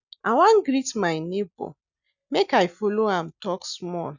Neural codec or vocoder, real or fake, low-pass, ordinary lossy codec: none; real; 7.2 kHz; none